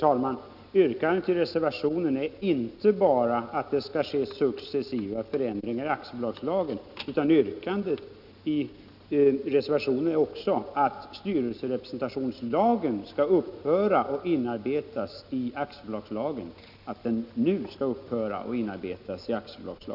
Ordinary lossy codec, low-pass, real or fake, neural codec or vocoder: none; 5.4 kHz; real; none